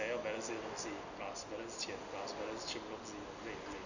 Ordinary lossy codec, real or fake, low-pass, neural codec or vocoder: none; real; 7.2 kHz; none